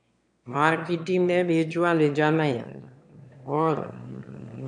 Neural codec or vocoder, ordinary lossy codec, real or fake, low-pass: autoencoder, 22.05 kHz, a latent of 192 numbers a frame, VITS, trained on one speaker; MP3, 64 kbps; fake; 9.9 kHz